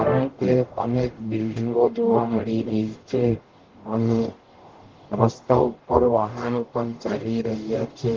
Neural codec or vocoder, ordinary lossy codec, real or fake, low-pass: codec, 44.1 kHz, 0.9 kbps, DAC; Opus, 16 kbps; fake; 7.2 kHz